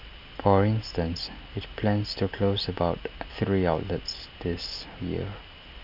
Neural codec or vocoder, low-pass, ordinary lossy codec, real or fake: none; 5.4 kHz; none; real